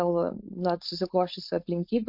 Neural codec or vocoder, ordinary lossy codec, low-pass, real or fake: codec, 16 kHz, 4.8 kbps, FACodec; MP3, 48 kbps; 5.4 kHz; fake